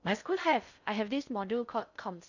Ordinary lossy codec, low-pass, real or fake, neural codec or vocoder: none; 7.2 kHz; fake; codec, 16 kHz in and 24 kHz out, 0.6 kbps, FocalCodec, streaming, 4096 codes